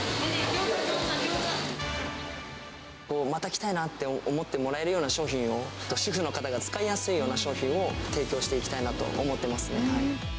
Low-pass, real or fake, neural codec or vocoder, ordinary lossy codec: none; real; none; none